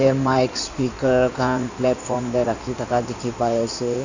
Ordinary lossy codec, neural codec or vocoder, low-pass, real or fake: none; codec, 16 kHz in and 24 kHz out, 2.2 kbps, FireRedTTS-2 codec; 7.2 kHz; fake